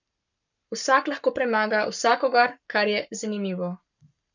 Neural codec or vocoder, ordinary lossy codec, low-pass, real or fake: codec, 44.1 kHz, 7.8 kbps, Pupu-Codec; none; 7.2 kHz; fake